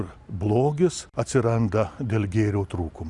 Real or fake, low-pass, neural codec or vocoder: real; 10.8 kHz; none